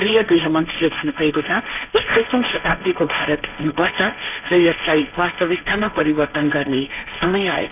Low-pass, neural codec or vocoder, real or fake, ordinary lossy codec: 3.6 kHz; codec, 16 kHz, 1.1 kbps, Voila-Tokenizer; fake; none